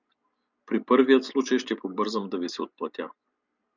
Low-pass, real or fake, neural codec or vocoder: 7.2 kHz; real; none